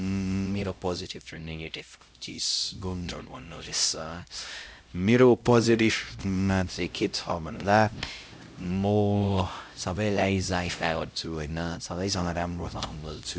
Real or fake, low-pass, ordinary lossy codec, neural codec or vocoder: fake; none; none; codec, 16 kHz, 0.5 kbps, X-Codec, HuBERT features, trained on LibriSpeech